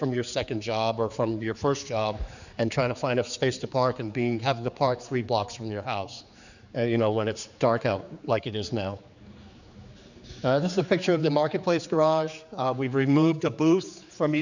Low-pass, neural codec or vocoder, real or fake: 7.2 kHz; codec, 16 kHz, 4 kbps, X-Codec, HuBERT features, trained on general audio; fake